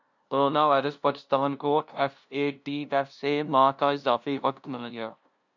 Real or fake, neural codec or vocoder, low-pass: fake; codec, 16 kHz, 0.5 kbps, FunCodec, trained on LibriTTS, 25 frames a second; 7.2 kHz